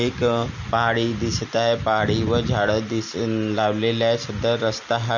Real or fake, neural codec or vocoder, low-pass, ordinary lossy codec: real; none; 7.2 kHz; none